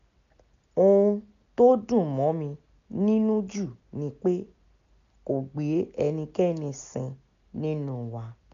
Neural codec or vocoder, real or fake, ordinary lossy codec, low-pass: none; real; none; 7.2 kHz